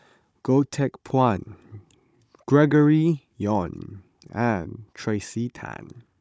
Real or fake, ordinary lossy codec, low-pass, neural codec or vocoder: fake; none; none; codec, 16 kHz, 16 kbps, FunCodec, trained on Chinese and English, 50 frames a second